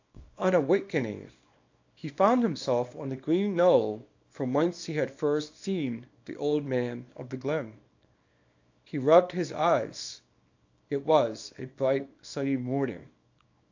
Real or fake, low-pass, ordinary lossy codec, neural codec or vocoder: fake; 7.2 kHz; AAC, 48 kbps; codec, 24 kHz, 0.9 kbps, WavTokenizer, small release